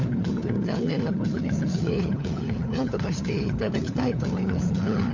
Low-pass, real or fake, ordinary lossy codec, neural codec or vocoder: 7.2 kHz; fake; none; codec, 16 kHz, 4 kbps, FunCodec, trained on LibriTTS, 50 frames a second